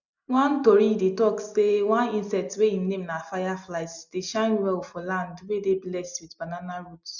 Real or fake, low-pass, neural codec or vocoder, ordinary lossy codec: real; 7.2 kHz; none; none